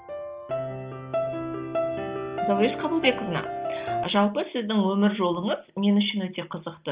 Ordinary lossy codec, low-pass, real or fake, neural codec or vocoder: Opus, 24 kbps; 3.6 kHz; real; none